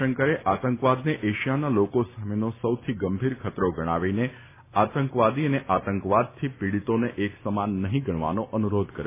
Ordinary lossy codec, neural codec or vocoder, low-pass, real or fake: MP3, 32 kbps; none; 3.6 kHz; real